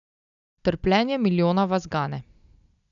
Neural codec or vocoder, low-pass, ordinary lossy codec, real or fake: none; 7.2 kHz; none; real